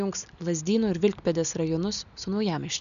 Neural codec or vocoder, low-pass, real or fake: none; 7.2 kHz; real